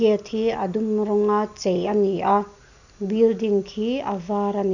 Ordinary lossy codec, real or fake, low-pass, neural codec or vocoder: none; real; 7.2 kHz; none